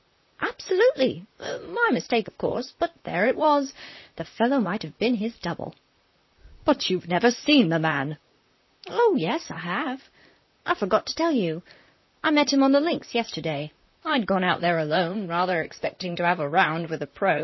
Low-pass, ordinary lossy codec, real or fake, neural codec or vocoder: 7.2 kHz; MP3, 24 kbps; fake; vocoder, 44.1 kHz, 128 mel bands, Pupu-Vocoder